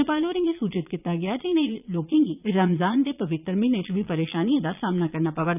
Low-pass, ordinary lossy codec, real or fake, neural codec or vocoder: 3.6 kHz; none; fake; vocoder, 22.05 kHz, 80 mel bands, Vocos